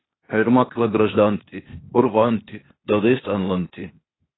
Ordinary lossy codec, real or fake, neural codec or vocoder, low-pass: AAC, 16 kbps; fake; codec, 16 kHz, 0.8 kbps, ZipCodec; 7.2 kHz